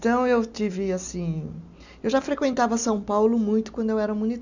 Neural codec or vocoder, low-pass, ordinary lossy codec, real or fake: none; 7.2 kHz; none; real